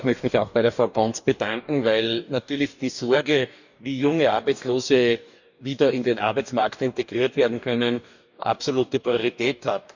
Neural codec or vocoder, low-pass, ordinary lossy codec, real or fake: codec, 44.1 kHz, 2.6 kbps, DAC; 7.2 kHz; none; fake